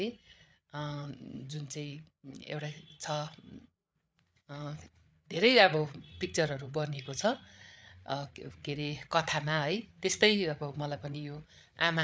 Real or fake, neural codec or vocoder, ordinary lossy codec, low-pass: fake; codec, 16 kHz, 8 kbps, FreqCodec, larger model; none; none